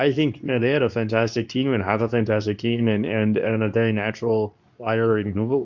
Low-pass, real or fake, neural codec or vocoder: 7.2 kHz; fake; codec, 24 kHz, 0.9 kbps, WavTokenizer, medium speech release version 2